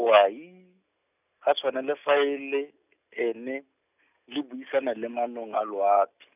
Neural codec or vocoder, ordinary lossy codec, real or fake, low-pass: none; none; real; 3.6 kHz